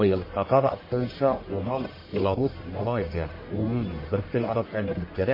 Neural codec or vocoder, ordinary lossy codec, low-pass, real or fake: codec, 44.1 kHz, 1.7 kbps, Pupu-Codec; MP3, 24 kbps; 5.4 kHz; fake